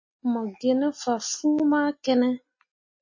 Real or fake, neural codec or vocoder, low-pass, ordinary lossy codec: real; none; 7.2 kHz; MP3, 32 kbps